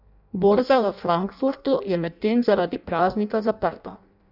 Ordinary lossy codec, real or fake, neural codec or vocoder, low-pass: none; fake; codec, 16 kHz in and 24 kHz out, 0.6 kbps, FireRedTTS-2 codec; 5.4 kHz